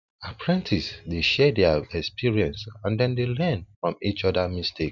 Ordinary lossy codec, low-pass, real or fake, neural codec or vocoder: none; 7.2 kHz; real; none